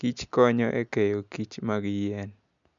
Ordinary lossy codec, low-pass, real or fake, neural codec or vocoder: none; 7.2 kHz; real; none